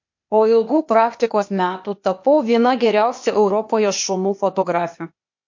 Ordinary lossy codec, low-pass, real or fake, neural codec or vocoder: MP3, 48 kbps; 7.2 kHz; fake; codec, 16 kHz, 0.8 kbps, ZipCodec